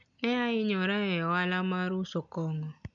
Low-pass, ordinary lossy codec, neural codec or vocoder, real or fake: 7.2 kHz; none; none; real